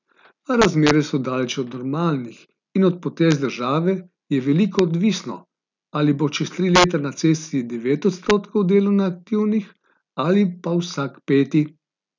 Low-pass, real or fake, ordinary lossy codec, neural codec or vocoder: 7.2 kHz; real; none; none